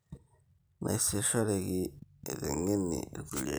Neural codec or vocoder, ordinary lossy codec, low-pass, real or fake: none; none; none; real